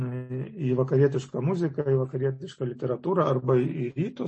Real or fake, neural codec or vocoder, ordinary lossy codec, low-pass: real; none; MP3, 32 kbps; 10.8 kHz